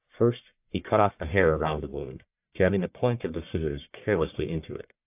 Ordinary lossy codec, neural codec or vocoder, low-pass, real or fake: AAC, 32 kbps; codec, 44.1 kHz, 1.7 kbps, Pupu-Codec; 3.6 kHz; fake